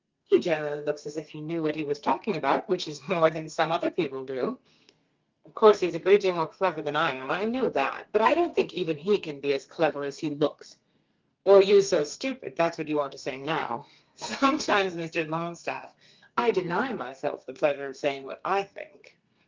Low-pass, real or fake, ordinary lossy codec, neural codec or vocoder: 7.2 kHz; fake; Opus, 24 kbps; codec, 32 kHz, 1.9 kbps, SNAC